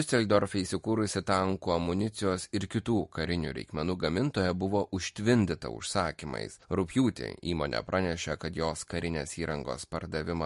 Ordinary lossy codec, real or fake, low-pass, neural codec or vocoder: MP3, 48 kbps; real; 14.4 kHz; none